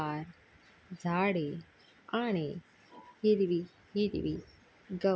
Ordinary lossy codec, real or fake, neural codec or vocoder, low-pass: none; real; none; none